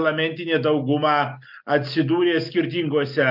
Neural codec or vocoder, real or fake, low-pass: none; real; 5.4 kHz